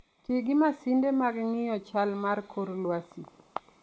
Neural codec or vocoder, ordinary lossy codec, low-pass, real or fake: none; none; none; real